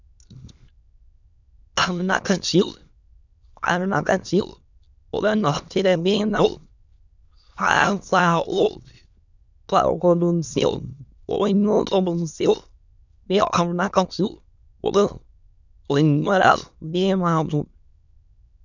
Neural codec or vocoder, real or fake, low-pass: autoencoder, 22.05 kHz, a latent of 192 numbers a frame, VITS, trained on many speakers; fake; 7.2 kHz